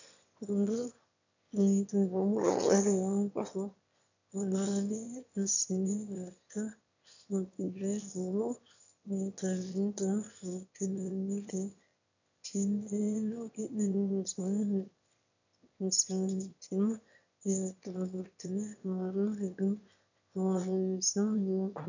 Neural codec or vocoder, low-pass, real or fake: autoencoder, 22.05 kHz, a latent of 192 numbers a frame, VITS, trained on one speaker; 7.2 kHz; fake